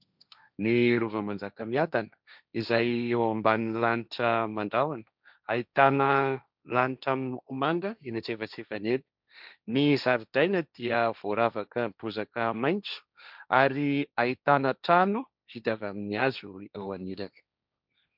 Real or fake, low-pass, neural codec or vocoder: fake; 5.4 kHz; codec, 16 kHz, 1.1 kbps, Voila-Tokenizer